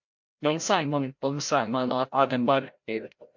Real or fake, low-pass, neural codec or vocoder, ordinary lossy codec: fake; 7.2 kHz; codec, 16 kHz, 0.5 kbps, FreqCodec, larger model; MP3, 48 kbps